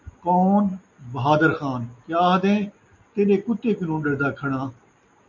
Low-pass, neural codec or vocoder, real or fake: 7.2 kHz; none; real